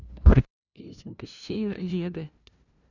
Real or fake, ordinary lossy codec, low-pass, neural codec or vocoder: fake; none; 7.2 kHz; codec, 16 kHz, 0.5 kbps, FunCodec, trained on LibriTTS, 25 frames a second